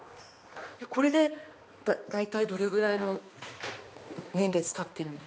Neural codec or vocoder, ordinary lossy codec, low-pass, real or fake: codec, 16 kHz, 2 kbps, X-Codec, HuBERT features, trained on balanced general audio; none; none; fake